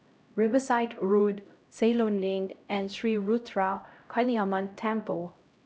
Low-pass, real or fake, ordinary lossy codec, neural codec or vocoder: none; fake; none; codec, 16 kHz, 0.5 kbps, X-Codec, HuBERT features, trained on LibriSpeech